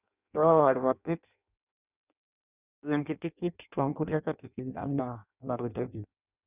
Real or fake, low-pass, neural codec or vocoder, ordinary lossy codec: fake; 3.6 kHz; codec, 16 kHz in and 24 kHz out, 0.6 kbps, FireRedTTS-2 codec; none